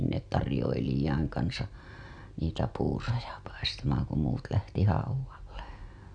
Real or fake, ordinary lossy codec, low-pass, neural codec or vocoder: real; MP3, 96 kbps; 9.9 kHz; none